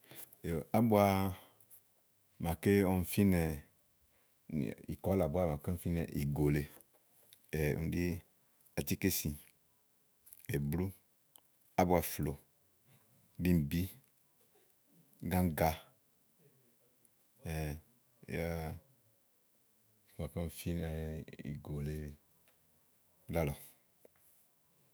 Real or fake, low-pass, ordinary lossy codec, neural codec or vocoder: fake; none; none; autoencoder, 48 kHz, 128 numbers a frame, DAC-VAE, trained on Japanese speech